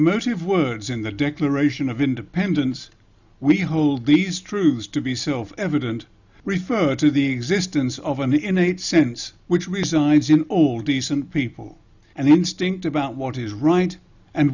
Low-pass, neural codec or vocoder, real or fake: 7.2 kHz; none; real